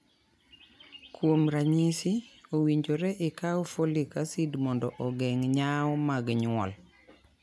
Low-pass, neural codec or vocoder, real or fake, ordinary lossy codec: none; none; real; none